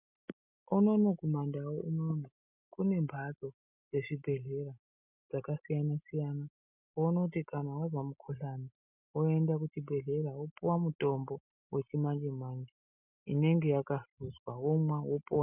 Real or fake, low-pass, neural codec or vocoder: real; 3.6 kHz; none